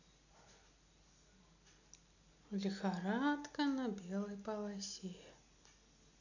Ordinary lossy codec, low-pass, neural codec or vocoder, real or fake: none; 7.2 kHz; none; real